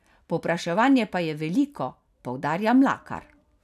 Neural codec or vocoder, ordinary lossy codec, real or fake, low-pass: none; none; real; 14.4 kHz